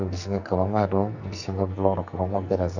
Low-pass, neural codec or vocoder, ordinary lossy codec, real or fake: 7.2 kHz; codec, 44.1 kHz, 2.6 kbps, SNAC; none; fake